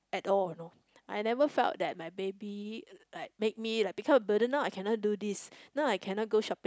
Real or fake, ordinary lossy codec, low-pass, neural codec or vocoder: real; none; none; none